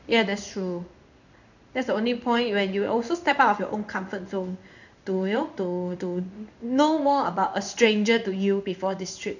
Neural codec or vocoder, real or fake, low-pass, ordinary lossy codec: codec, 16 kHz in and 24 kHz out, 1 kbps, XY-Tokenizer; fake; 7.2 kHz; none